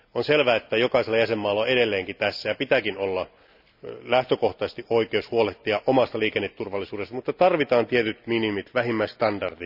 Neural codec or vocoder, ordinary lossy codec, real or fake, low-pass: none; none; real; 5.4 kHz